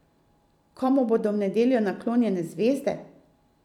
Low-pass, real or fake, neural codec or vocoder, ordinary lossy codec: 19.8 kHz; fake; vocoder, 44.1 kHz, 128 mel bands every 256 samples, BigVGAN v2; none